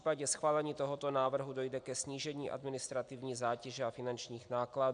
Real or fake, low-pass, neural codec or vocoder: real; 9.9 kHz; none